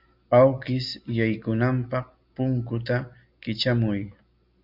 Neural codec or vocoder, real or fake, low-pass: none; real; 5.4 kHz